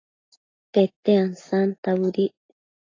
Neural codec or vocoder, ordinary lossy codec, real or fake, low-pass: none; AAC, 32 kbps; real; 7.2 kHz